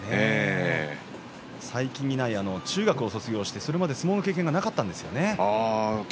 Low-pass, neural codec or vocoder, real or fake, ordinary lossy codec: none; none; real; none